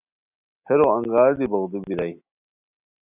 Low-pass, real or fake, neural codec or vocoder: 3.6 kHz; real; none